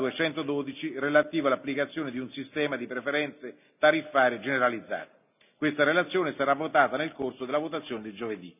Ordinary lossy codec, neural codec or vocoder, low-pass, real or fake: none; none; 3.6 kHz; real